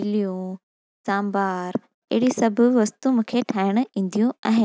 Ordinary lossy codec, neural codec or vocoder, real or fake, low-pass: none; none; real; none